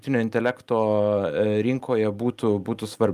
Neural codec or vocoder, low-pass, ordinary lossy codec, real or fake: none; 14.4 kHz; Opus, 32 kbps; real